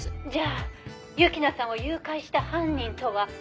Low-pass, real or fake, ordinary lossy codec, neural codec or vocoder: none; real; none; none